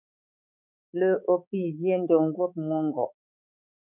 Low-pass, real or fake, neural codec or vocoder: 3.6 kHz; fake; codec, 16 kHz, 4 kbps, X-Codec, HuBERT features, trained on balanced general audio